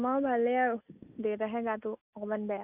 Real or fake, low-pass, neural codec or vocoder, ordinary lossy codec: real; 3.6 kHz; none; none